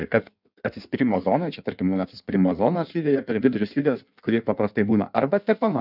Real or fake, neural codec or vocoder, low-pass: fake; codec, 16 kHz in and 24 kHz out, 1.1 kbps, FireRedTTS-2 codec; 5.4 kHz